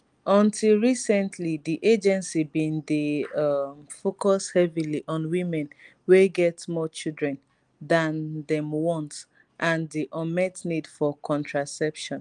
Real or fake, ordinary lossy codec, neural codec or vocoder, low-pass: real; Opus, 32 kbps; none; 9.9 kHz